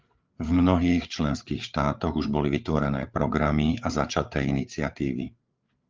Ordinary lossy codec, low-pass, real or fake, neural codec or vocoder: Opus, 32 kbps; 7.2 kHz; fake; codec, 16 kHz, 8 kbps, FreqCodec, larger model